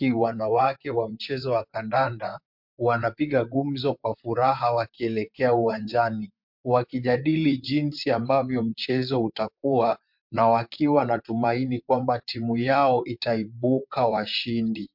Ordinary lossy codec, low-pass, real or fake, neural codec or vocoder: MP3, 48 kbps; 5.4 kHz; fake; vocoder, 44.1 kHz, 128 mel bands, Pupu-Vocoder